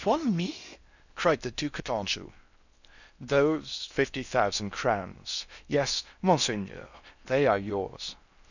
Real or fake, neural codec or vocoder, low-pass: fake; codec, 16 kHz in and 24 kHz out, 0.6 kbps, FocalCodec, streaming, 4096 codes; 7.2 kHz